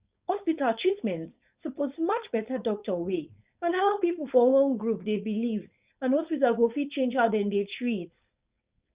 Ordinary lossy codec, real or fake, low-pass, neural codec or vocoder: Opus, 64 kbps; fake; 3.6 kHz; codec, 16 kHz, 4.8 kbps, FACodec